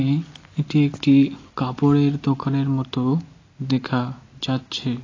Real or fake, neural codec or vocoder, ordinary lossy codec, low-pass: real; none; AAC, 32 kbps; 7.2 kHz